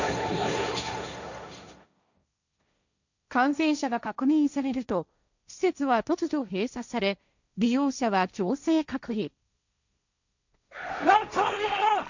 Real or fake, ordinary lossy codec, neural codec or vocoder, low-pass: fake; none; codec, 16 kHz, 1.1 kbps, Voila-Tokenizer; 7.2 kHz